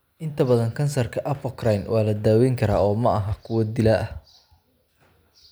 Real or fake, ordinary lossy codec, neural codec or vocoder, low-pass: real; none; none; none